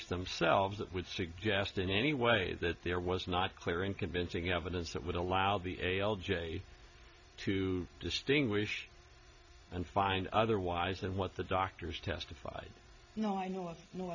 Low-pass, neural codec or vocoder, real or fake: 7.2 kHz; none; real